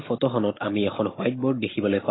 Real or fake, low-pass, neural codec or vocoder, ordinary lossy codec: real; 7.2 kHz; none; AAC, 16 kbps